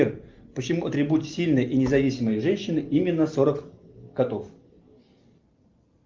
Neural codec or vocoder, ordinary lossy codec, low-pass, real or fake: none; Opus, 24 kbps; 7.2 kHz; real